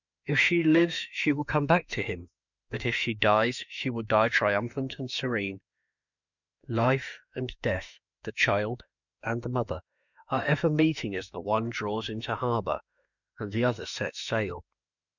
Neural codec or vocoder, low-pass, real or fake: autoencoder, 48 kHz, 32 numbers a frame, DAC-VAE, trained on Japanese speech; 7.2 kHz; fake